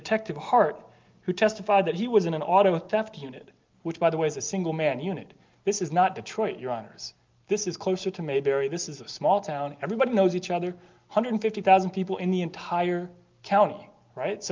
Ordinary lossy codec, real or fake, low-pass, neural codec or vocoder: Opus, 24 kbps; real; 7.2 kHz; none